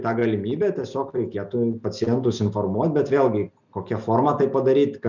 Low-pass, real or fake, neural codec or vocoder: 7.2 kHz; real; none